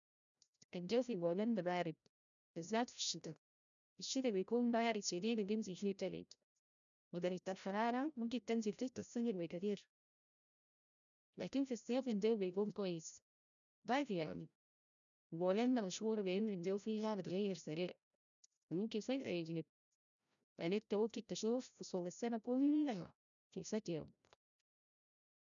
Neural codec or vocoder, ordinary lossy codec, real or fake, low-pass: codec, 16 kHz, 0.5 kbps, FreqCodec, larger model; none; fake; 7.2 kHz